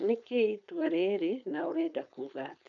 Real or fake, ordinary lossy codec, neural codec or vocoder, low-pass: fake; none; codec, 16 kHz, 4.8 kbps, FACodec; 7.2 kHz